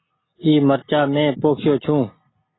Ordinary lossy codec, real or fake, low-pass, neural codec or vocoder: AAC, 16 kbps; real; 7.2 kHz; none